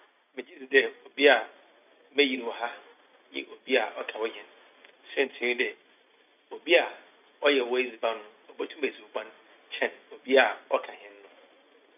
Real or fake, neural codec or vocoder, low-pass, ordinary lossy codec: real; none; 3.6 kHz; none